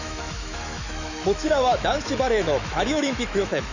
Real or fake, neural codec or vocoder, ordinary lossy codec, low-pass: fake; autoencoder, 48 kHz, 128 numbers a frame, DAC-VAE, trained on Japanese speech; none; 7.2 kHz